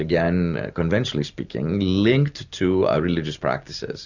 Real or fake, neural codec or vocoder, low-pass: real; none; 7.2 kHz